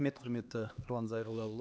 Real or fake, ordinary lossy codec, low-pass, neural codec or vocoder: fake; none; none; codec, 16 kHz, 2 kbps, X-Codec, HuBERT features, trained on LibriSpeech